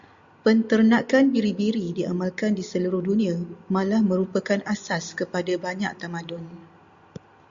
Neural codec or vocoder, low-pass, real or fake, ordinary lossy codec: none; 7.2 kHz; real; Opus, 64 kbps